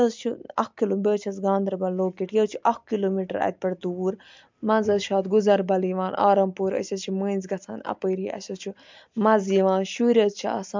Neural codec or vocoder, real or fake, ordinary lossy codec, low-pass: none; real; MP3, 64 kbps; 7.2 kHz